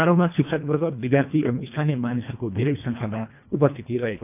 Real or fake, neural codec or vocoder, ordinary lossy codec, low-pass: fake; codec, 24 kHz, 1.5 kbps, HILCodec; none; 3.6 kHz